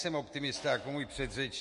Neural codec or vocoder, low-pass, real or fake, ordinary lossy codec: autoencoder, 48 kHz, 128 numbers a frame, DAC-VAE, trained on Japanese speech; 14.4 kHz; fake; MP3, 48 kbps